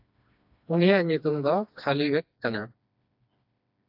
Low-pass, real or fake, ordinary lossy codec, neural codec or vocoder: 5.4 kHz; fake; AAC, 48 kbps; codec, 16 kHz, 2 kbps, FreqCodec, smaller model